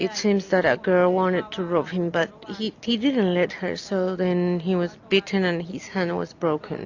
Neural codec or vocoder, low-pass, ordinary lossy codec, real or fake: none; 7.2 kHz; AAC, 48 kbps; real